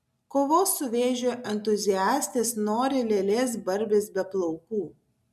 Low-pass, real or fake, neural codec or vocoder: 14.4 kHz; real; none